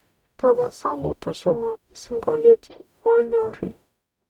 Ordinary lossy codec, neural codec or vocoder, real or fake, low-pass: none; codec, 44.1 kHz, 0.9 kbps, DAC; fake; 19.8 kHz